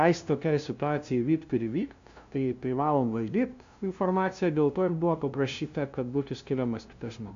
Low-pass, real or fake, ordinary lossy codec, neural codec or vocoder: 7.2 kHz; fake; AAC, 64 kbps; codec, 16 kHz, 0.5 kbps, FunCodec, trained on LibriTTS, 25 frames a second